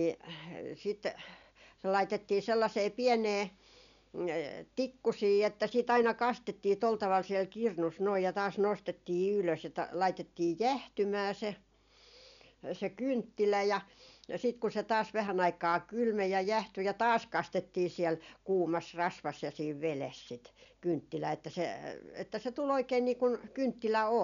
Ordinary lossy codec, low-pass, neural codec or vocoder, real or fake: none; 7.2 kHz; none; real